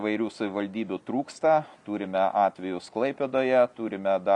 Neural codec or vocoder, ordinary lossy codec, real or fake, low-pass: none; MP3, 96 kbps; real; 10.8 kHz